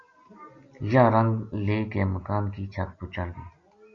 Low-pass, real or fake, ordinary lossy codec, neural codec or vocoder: 7.2 kHz; real; Opus, 64 kbps; none